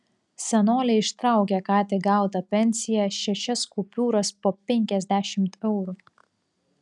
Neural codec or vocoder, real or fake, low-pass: none; real; 10.8 kHz